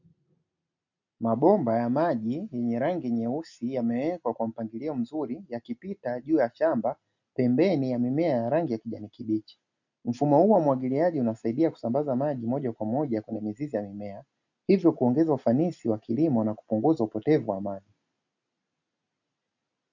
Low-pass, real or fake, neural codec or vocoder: 7.2 kHz; real; none